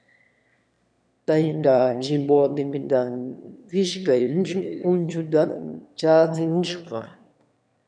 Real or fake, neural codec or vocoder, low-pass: fake; autoencoder, 22.05 kHz, a latent of 192 numbers a frame, VITS, trained on one speaker; 9.9 kHz